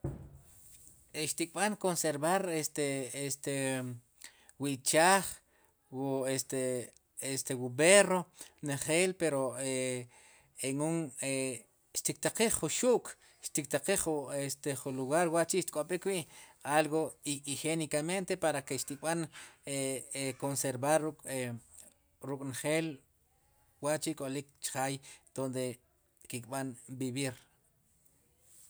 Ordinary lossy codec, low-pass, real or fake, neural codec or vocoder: none; none; real; none